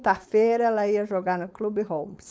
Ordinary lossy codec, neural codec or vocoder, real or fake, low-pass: none; codec, 16 kHz, 4.8 kbps, FACodec; fake; none